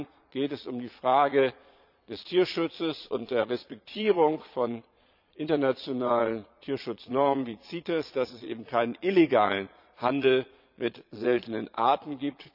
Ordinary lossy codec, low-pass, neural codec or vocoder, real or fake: none; 5.4 kHz; vocoder, 22.05 kHz, 80 mel bands, Vocos; fake